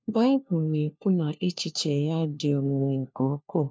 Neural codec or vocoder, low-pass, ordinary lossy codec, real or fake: codec, 16 kHz, 1 kbps, FunCodec, trained on LibriTTS, 50 frames a second; none; none; fake